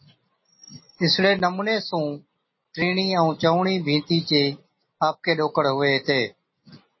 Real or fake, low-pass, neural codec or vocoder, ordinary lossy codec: real; 7.2 kHz; none; MP3, 24 kbps